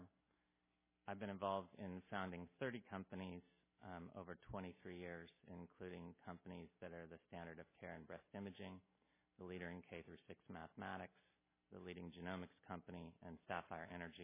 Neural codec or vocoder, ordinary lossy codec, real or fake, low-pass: none; MP3, 16 kbps; real; 3.6 kHz